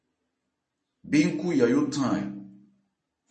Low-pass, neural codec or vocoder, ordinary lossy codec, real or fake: 10.8 kHz; vocoder, 44.1 kHz, 128 mel bands every 256 samples, BigVGAN v2; MP3, 32 kbps; fake